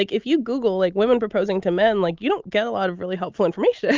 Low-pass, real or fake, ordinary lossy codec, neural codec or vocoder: 7.2 kHz; real; Opus, 32 kbps; none